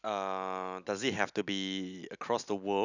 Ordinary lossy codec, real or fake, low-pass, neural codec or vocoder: none; real; 7.2 kHz; none